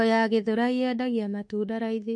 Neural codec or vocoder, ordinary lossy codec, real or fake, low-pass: autoencoder, 48 kHz, 32 numbers a frame, DAC-VAE, trained on Japanese speech; MP3, 48 kbps; fake; 10.8 kHz